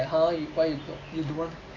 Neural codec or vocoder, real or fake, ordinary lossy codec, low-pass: none; real; AAC, 32 kbps; 7.2 kHz